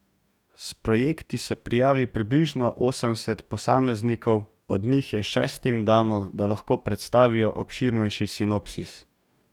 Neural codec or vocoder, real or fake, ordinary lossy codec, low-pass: codec, 44.1 kHz, 2.6 kbps, DAC; fake; none; 19.8 kHz